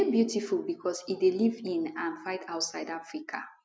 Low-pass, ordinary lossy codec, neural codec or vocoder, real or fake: none; none; none; real